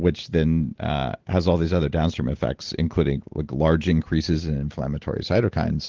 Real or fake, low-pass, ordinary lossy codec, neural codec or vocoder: real; 7.2 kHz; Opus, 16 kbps; none